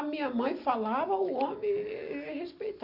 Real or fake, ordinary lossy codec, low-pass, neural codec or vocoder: real; none; 5.4 kHz; none